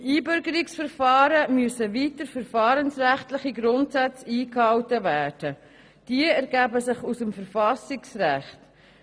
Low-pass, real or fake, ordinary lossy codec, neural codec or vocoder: none; real; none; none